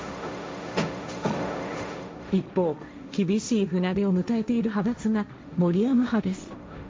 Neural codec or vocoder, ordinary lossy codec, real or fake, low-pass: codec, 16 kHz, 1.1 kbps, Voila-Tokenizer; none; fake; none